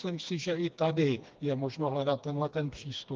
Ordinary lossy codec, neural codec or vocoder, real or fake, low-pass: Opus, 32 kbps; codec, 16 kHz, 2 kbps, FreqCodec, smaller model; fake; 7.2 kHz